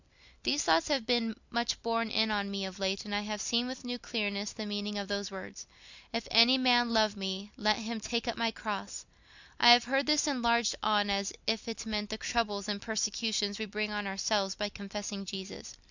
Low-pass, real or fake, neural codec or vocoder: 7.2 kHz; real; none